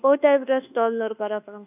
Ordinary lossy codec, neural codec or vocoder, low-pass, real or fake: none; codec, 24 kHz, 1.2 kbps, DualCodec; 3.6 kHz; fake